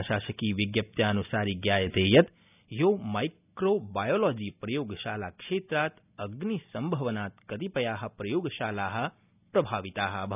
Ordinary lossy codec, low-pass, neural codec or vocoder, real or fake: none; 3.6 kHz; none; real